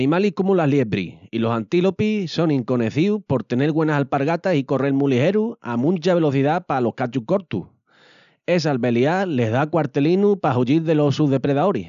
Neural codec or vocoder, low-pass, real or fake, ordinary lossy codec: none; 7.2 kHz; real; none